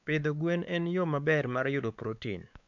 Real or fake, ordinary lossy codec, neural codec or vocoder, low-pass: fake; none; codec, 16 kHz, 4 kbps, X-Codec, WavLM features, trained on Multilingual LibriSpeech; 7.2 kHz